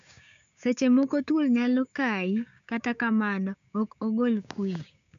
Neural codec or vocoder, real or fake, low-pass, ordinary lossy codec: codec, 16 kHz, 6 kbps, DAC; fake; 7.2 kHz; none